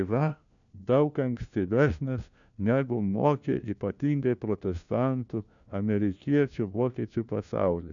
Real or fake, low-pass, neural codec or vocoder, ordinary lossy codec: fake; 7.2 kHz; codec, 16 kHz, 1 kbps, FunCodec, trained on LibriTTS, 50 frames a second; MP3, 96 kbps